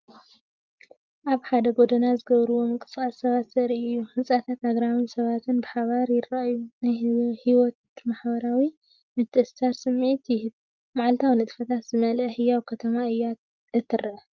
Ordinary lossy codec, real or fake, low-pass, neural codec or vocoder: Opus, 32 kbps; real; 7.2 kHz; none